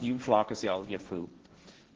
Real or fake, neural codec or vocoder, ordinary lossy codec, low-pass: fake; codec, 16 kHz, 1.1 kbps, Voila-Tokenizer; Opus, 16 kbps; 7.2 kHz